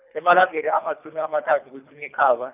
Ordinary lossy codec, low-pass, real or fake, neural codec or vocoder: none; 3.6 kHz; fake; codec, 24 kHz, 3 kbps, HILCodec